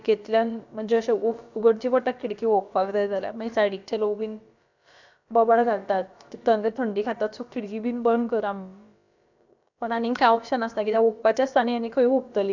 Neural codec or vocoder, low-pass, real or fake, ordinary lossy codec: codec, 16 kHz, about 1 kbps, DyCAST, with the encoder's durations; 7.2 kHz; fake; none